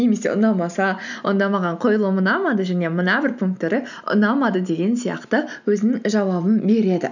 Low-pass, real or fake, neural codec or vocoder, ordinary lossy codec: 7.2 kHz; real; none; none